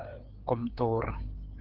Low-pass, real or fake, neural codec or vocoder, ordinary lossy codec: 5.4 kHz; fake; codec, 16 kHz, 4 kbps, FreqCodec, larger model; Opus, 16 kbps